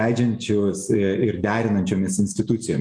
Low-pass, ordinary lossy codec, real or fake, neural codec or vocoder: 9.9 kHz; MP3, 96 kbps; real; none